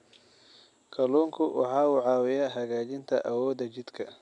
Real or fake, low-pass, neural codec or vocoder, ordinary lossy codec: real; 10.8 kHz; none; none